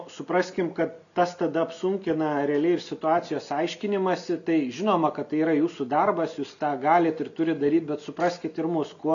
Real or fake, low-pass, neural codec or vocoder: real; 7.2 kHz; none